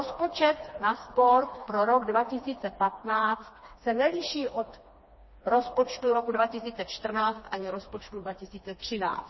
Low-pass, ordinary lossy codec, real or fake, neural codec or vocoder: 7.2 kHz; MP3, 24 kbps; fake; codec, 16 kHz, 2 kbps, FreqCodec, smaller model